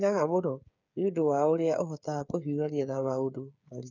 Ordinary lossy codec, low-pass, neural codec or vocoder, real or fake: none; 7.2 kHz; codec, 16 kHz, 8 kbps, FreqCodec, smaller model; fake